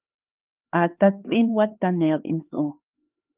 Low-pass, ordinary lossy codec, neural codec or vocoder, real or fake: 3.6 kHz; Opus, 32 kbps; codec, 16 kHz, 4 kbps, X-Codec, HuBERT features, trained on LibriSpeech; fake